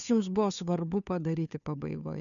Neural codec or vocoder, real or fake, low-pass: codec, 16 kHz, 2 kbps, FunCodec, trained on Chinese and English, 25 frames a second; fake; 7.2 kHz